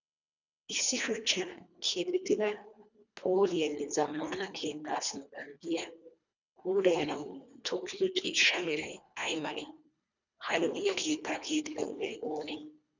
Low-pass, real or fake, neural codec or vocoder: 7.2 kHz; fake; codec, 24 kHz, 1.5 kbps, HILCodec